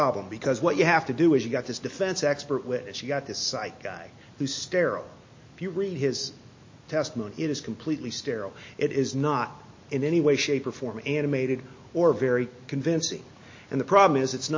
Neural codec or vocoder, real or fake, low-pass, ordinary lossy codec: none; real; 7.2 kHz; MP3, 32 kbps